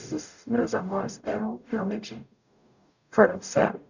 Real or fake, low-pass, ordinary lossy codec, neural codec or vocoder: fake; 7.2 kHz; none; codec, 44.1 kHz, 0.9 kbps, DAC